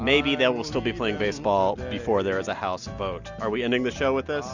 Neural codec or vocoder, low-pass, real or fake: none; 7.2 kHz; real